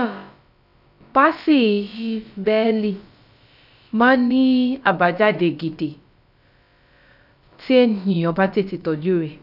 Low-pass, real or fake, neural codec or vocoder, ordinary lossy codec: 5.4 kHz; fake; codec, 16 kHz, about 1 kbps, DyCAST, with the encoder's durations; none